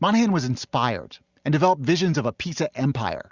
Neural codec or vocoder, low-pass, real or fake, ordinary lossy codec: none; 7.2 kHz; real; Opus, 64 kbps